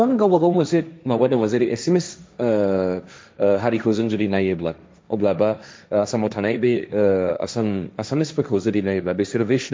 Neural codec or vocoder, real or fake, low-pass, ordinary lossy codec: codec, 16 kHz, 1.1 kbps, Voila-Tokenizer; fake; none; none